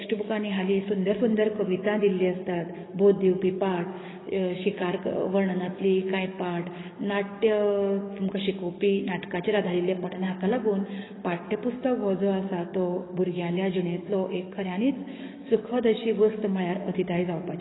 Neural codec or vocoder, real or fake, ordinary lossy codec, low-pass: codec, 44.1 kHz, 7.8 kbps, DAC; fake; AAC, 16 kbps; 7.2 kHz